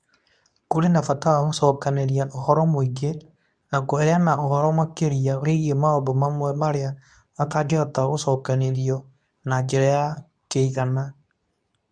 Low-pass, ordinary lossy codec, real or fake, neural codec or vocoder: 9.9 kHz; none; fake; codec, 24 kHz, 0.9 kbps, WavTokenizer, medium speech release version 2